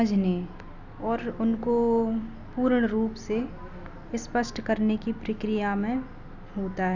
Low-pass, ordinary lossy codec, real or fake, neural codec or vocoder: 7.2 kHz; none; real; none